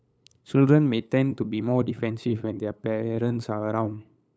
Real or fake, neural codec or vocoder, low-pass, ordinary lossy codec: fake; codec, 16 kHz, 8 kbps, FunCodec, trained on LibriTTS, 25 frames a second; none; none